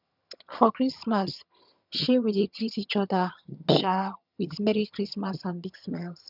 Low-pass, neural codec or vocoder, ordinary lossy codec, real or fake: 5.4 kHz; vocoder, 22.05 kHz, 80 mel bands, HiFi-GAN; none; fake